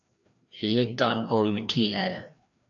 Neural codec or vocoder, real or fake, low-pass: codec, 16 kHz, 1 kbps, FreqCodec, larger model; fake; 7.2 kHz